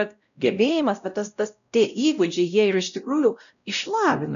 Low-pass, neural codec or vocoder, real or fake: 7.2 kHz; codec, 16 kHz, 0.5 kbps, X-Codec, WavLM features, trained on Multilingual LibriSpeech; fake